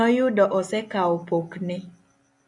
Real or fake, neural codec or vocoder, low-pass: real; none; 10.8 kHz